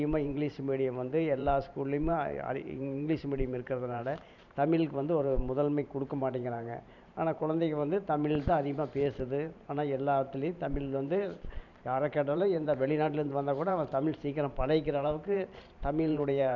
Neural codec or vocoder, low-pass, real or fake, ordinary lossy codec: vocoder, 44.1 kHz, 128 mel bands every 512 samples, BigVGAN v2; 7.2 kHz; fake; none